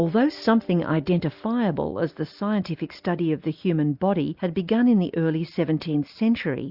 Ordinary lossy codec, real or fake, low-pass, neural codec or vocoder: Opus, 64 kbps; real; 5.4 kHz; none